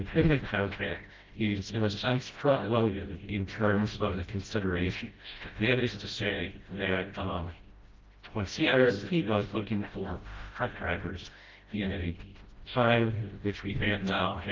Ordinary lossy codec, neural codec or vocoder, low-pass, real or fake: Opus, 32 kbps; codec, 16 kHz, 0.5 kbps, FreqCodec, smaller model; 7.2 kHz; fake